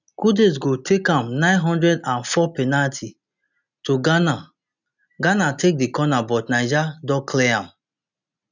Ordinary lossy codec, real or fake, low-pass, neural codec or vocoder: none; real; 7.2 kHz; none